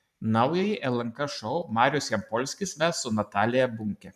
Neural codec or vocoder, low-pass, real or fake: vocoder, 44.1 kHz, 128 mel bands every 512 samples, BigVGAN v2; 14.4 kHz; fake